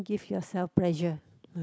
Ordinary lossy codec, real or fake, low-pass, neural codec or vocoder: none; real; none; none